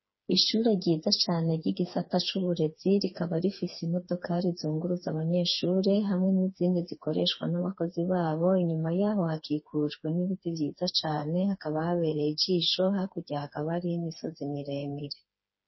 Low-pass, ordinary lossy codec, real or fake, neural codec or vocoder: 7.2 kHz; MP3, 24 kbps; fake; codec, 16 kHz, 4 kbps, FreqCodec, smaller model